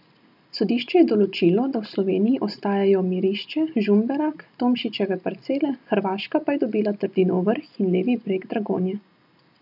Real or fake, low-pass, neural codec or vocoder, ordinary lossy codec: real; 5.4 kHz; none; none